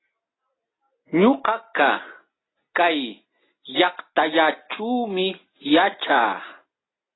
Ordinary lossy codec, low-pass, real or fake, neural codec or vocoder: AAC, 16 kbps; 7.2 kHz; real; none